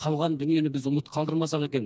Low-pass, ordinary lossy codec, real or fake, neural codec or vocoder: none; none; fake; codec, 16 kHz, 2 kbps, FreqCodec, smaller model